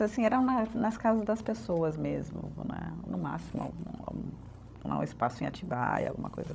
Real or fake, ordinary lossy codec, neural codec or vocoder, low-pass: fake; none; codec, 16 kHz, 16 kbps, FreqCodec, larger model; none